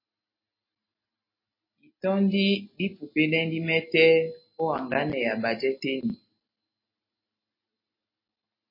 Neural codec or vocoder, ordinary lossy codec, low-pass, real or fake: none; MP3, 24 kbps; 5.4 kHz; real